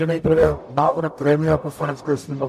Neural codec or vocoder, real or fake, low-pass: codec, 44.1 kHz, 0.9 kbps, DAC; fake; 14.4 kHz